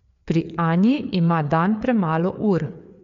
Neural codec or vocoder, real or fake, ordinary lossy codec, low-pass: codec, 16 kHz, 4 kbps, FreqCodec, larger model; fake; MP3, 64 kbps; 7.2 kHz